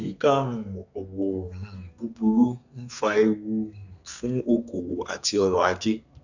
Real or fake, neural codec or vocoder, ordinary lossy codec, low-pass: fake; codec, 32 kHz, 1.9 kbps, SNAC; none; 7.2 kHz